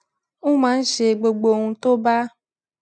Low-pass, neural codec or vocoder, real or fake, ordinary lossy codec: none; none; real; none